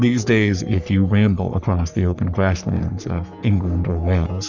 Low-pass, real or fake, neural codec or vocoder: 7.2 kHz; fake; codec, 44.1 kHz, 3.4 kbps, Pupu-Codec